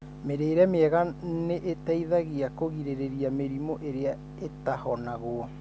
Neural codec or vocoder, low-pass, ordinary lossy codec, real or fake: none; none; none; real